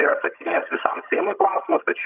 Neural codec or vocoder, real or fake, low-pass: vocoder, 22.05 kHz, 80 mel bands, HiFi-GAN; fake; 3.6 kHz